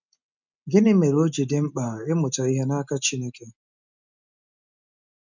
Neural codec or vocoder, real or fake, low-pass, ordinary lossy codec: none; real; 7.2 kHz; none